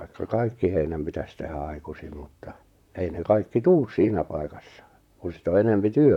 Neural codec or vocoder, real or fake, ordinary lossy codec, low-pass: vocoder, 44.1 kHz, 128 mel bands, Pupu-Vocoder; fake; none; 19.8 kHz